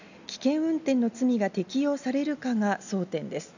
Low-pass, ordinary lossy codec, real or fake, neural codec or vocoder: 7.2 kHz; none; real; none